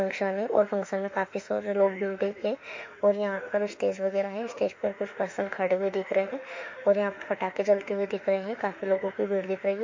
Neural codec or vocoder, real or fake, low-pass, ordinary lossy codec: autoencoder, 48 kHz, 32 numbers a frame, DAC-VAE, trained on Japanese speech; fake; 7.2 kHz; MP3, 48 kbps